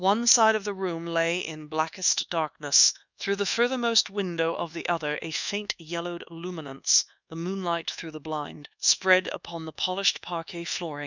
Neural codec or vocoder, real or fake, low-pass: codec, 24 kHz, 1.2 kbps, DualCodec; fake; 7.2 kHz